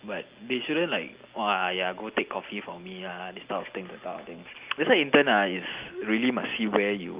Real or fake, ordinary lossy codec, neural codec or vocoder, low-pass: real; Opus, 24 kbps; none; 3.6 kHz